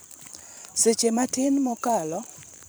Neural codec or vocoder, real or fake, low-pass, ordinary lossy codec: vocoder, 44.1 kHz, 128 mel bands every 512 samples, BigVGAN v2; fake; none; none